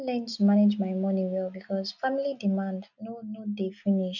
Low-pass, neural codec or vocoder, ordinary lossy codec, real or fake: 7.2 kHz; none; none; real